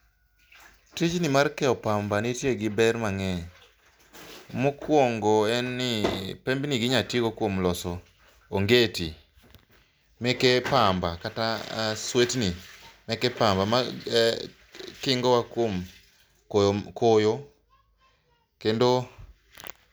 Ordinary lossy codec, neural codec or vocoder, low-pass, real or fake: none; none; none; real